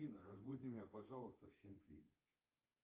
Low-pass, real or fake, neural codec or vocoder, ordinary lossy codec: 3.6 kHz; fake; codec, 24 kHz, 3.1 kbps, DualCodec; Opus, 24 kbps